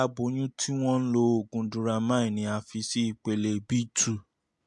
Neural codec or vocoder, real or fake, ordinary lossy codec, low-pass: none; real; MP3, 64 kbps; 10.8 kHz